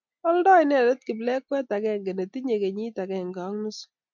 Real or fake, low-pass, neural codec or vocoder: real; 7.2 kHz; none